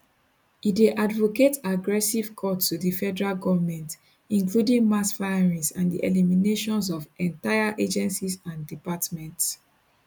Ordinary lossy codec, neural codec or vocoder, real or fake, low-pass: none; none; real; 19.8 kHz